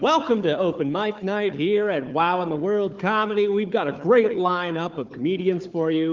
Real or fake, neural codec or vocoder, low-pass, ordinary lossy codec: fake; codec, 16 kHz, 4 kbps, FunCodec, trained on Chinese and English, 50 frames a second; 7.2 kHz; Opus, 32 kbps